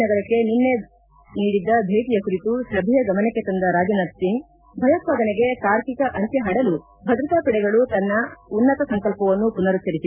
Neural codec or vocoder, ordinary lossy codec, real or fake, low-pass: none; none; real; 3.6 kHz